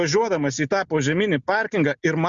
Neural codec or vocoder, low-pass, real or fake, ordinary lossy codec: none; 7.2 kHz; real; Opus, 64 kbps